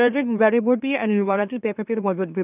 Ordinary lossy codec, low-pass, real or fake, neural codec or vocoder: none; 3.6 kHz; fake; autoencoder, 44.1 kHz, a latent of 192 numbers a frame, MeloTTS